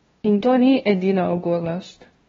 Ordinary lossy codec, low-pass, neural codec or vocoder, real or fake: AAC, 24 kbps; 7.2 kHz; codec, 16 kHz, 0.5 kbps, FunCodec, trained on LibriTTS, 25 frames a second; fake